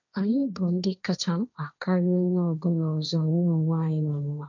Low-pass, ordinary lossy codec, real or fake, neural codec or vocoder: 7.2 kHz; none; fake; codec, 16 kHz, 1.1 kbps, Voila-Tokenizer